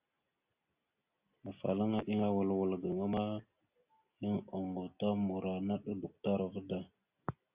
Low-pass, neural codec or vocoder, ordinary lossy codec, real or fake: 3.6 kHz; none; Opus, 64 kbps; real